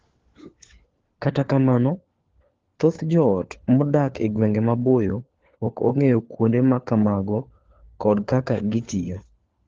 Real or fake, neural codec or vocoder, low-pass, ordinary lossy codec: fake; codec, 16 kHz, 4 kbps, FunCodec, trained on LibriTTS, 50 frames a second; 7.2 kHz; Opus, 16 kbps